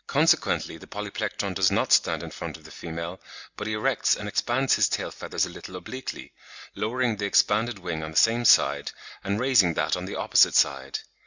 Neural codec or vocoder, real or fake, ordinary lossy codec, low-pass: none; real; Opus, 64 kbps; 7.2 kHz